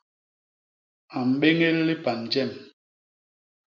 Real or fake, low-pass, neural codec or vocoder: real; 7.2 kHz; none